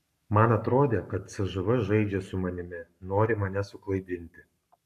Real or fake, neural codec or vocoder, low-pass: fake; codec, 44.1 kHz, 7.8 kbps, Pupu-Codec; 14.4 kHz